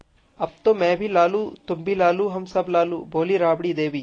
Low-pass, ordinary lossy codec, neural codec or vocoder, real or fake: 9.9 kHz; AAC, 32 kbps; none; real